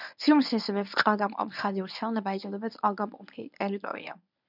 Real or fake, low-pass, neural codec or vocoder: fake; 5.4 kHz; codec, 24 kHz, 0.9 kbps, WavTokenizer, medium speech release version 1